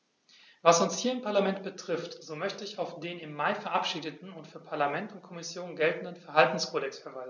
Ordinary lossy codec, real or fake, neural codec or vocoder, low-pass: none; real; none; 7.2 kHz